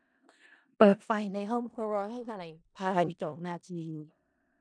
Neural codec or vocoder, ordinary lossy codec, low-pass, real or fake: codec, 16 kHz in and 24 kHz out, 0.4 kbps, LongCat-Audio-Codec, four codebook decoder; none; 9.9 kHz; fake